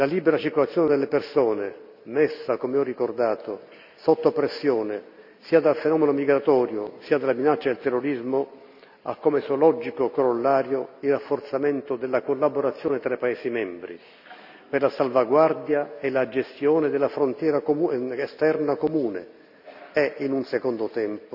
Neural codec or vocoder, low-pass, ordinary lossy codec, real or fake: none; 5.4 kHz; none; real